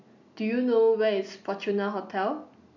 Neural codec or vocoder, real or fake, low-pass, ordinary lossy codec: none; real; 7.2 kHz; none